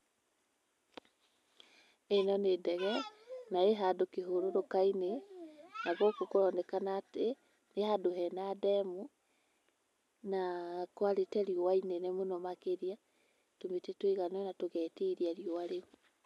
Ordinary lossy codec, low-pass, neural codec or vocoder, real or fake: none; none; none; real